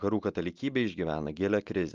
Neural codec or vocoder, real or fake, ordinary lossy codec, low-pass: none; real; Opus, 32 kbps; 7.2 kHz